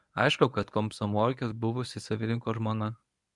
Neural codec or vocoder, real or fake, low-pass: codec, 24 kHz, 0.9 kbps, WavTokenizer, medium speech release version 1; fake; 10.8 kHz